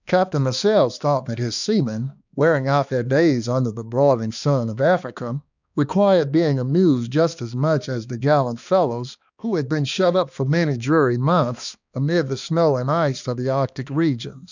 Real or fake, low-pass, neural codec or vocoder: fake; 7.2 kHz; codec, 16 kHz, 2 kbps, X-Codec, HuBERT features, trained on balanced general audio